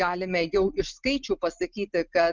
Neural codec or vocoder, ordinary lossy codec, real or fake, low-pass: none; Opus, 24 kbps; real; 7.2 kHz